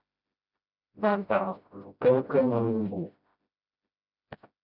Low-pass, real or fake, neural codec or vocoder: 5.4 kHz; fake; codec, 16 kHz, 0.5 kbps, FreqCodec, smaller model